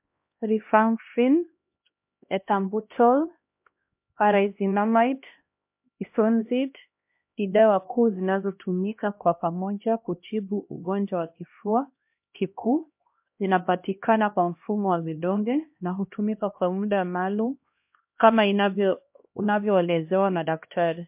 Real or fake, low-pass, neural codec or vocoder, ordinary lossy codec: fake; 3.6 kHz; codec, 16 kHz, 1 kbps, X-Codec, HuBERT features, trained on LibriSpeech; MP3, 32 kbps